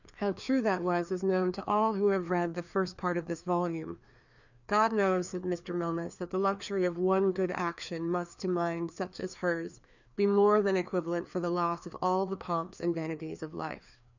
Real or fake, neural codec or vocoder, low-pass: fake; codec, 16 kHz, 2 kbps, FreqCodec, larger model; 7.2 kHz